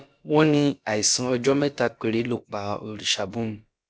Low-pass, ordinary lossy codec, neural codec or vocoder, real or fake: none; none; codec, 16 kHz, about 1 kbps, DyCAST, with the encoder's durations; fake